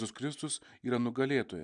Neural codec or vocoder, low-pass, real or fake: none; 9.9 kHz; real